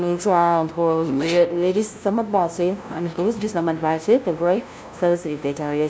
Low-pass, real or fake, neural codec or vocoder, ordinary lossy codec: none; fake; codec, 16 kHz, 0.5 kbps, FunCodec, trained on LibriTTS, 25 frames a second; none